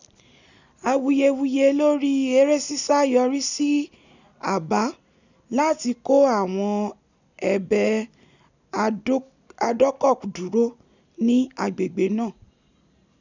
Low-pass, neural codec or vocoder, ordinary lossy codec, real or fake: 7.2 kHz; none; none; real